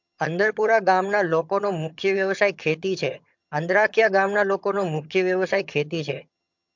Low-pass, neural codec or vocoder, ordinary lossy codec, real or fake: 7.2 kHz; vocoder, 22.05 kHz, 80 mel bands, HiFi-GAN; MP3, 64 kbps; fake